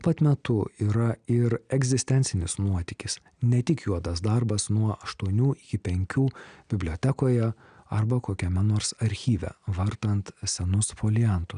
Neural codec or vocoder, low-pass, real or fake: none; 9.9 kHz; real